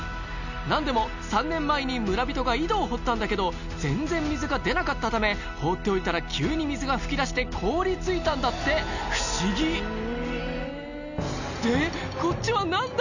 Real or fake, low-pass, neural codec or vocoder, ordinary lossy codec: real; 7.2 kHz; none; none